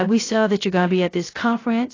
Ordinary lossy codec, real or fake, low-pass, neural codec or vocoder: AAC, 32 kbps; fake; 7.2 kHz; codec, 16 kHz, 0.3 kbps, FocalCodec